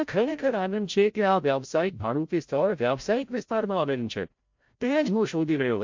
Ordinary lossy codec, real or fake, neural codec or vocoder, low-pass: MP3, 48 kbps; fake; codec, 16 kHz, 0.5 kbps, FreqCodec, larger model; 7.2 kHz